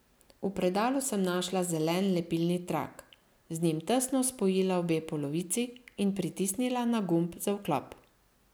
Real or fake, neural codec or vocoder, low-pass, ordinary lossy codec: real; none; none; none